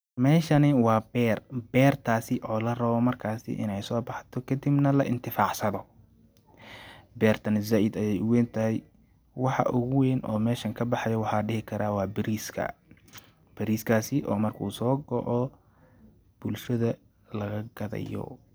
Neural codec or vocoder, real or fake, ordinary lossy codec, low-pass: none; real; none; none